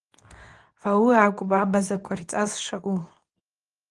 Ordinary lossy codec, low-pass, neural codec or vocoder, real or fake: Opus, 24 kbps; 10.8 kHz; codec, 24 kHz, 0.9 kbps, WavTokenizer, medium speech release version 2; fake